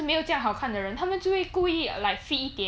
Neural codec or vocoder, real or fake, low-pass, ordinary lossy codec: none; real; none; none